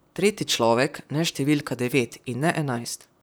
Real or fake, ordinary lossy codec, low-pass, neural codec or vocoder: fake; none; none; vocoder, 44.1 kHz, 128 mel bands, Pupu-Vocoder